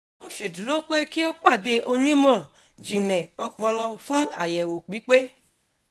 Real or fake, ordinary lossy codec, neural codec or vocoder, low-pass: fake; none; codec, 24 kHz, 0.9 kbps, WavTokenizer, medium speech release version 1; none